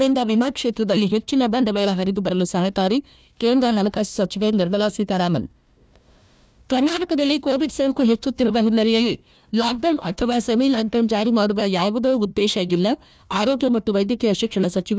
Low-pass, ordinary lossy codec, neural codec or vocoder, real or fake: none; none; codec, 16 kHz, 1 kbps, FunCodec, trained on Chinese and English, 50 frames a second; fake